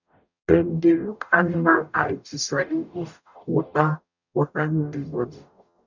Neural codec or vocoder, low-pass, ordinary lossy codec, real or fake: codec, 44.1 kHz, 0.9 kbps, DAC; 7.2 kHz; none; fake